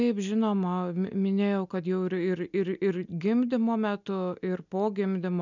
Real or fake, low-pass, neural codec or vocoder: real; 7.2 kHz; none